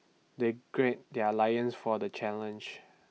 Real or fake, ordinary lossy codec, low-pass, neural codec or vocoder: real; none; none; none